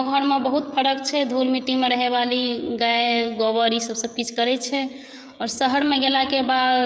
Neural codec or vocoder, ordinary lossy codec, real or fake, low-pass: codec, 16 kHz, 16 kbps, FreqCodec, smaller model; none; fake; none